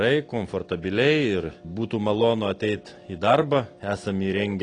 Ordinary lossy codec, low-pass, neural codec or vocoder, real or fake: AAC, 32 kbps; 9.9 kHz; none; real